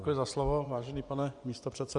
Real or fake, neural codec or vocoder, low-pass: fake; vocoder, 24 kHz, 100 mel bands, Vocos; 10.8 kHz